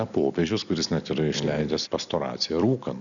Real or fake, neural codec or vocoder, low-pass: real; none; 7.2 kHz